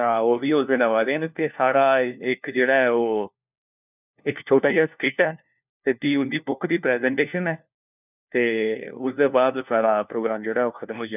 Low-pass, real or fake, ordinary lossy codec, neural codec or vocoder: 3.6 kHz; fake; none; codec, 16 kHz, 1 kbps, FunCodec, trained on LibriTTS, 50 frames a second